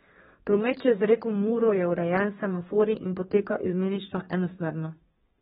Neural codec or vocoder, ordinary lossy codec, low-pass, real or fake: codec, 32 kHz, 1.9 kbps, SNAC; AAC, 16 kbps; 14.4 kHz; fake